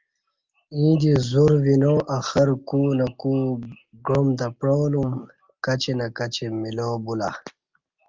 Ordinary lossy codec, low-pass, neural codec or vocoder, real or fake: Opus, 32 kbps; 7.2 kHz; none; real